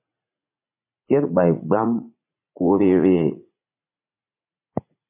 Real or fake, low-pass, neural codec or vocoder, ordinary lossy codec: fake; 3.6 kHz; vocoder, 44.1 kHz, 80 mel bands, Vocos; MP3, 24 kbps